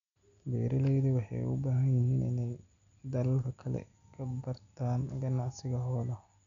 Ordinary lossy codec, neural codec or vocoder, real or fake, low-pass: none; none; real; 7.2 kHz